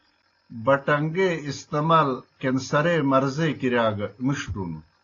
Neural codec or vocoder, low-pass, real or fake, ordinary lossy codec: none; 7.2 kHz; real; AAC, 32 kbps